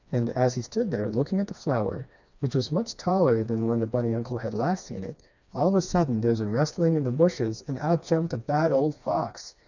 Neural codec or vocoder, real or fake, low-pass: codec, 16 kHz, 2 kbps, FreqCodec, smaller model; fake; 7.2 kHz